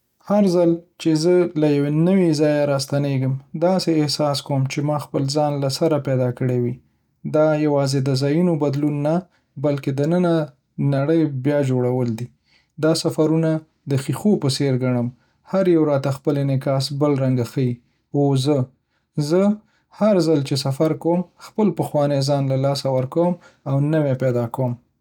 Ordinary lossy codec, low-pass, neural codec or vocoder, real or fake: none; 19.8 kHz; none; real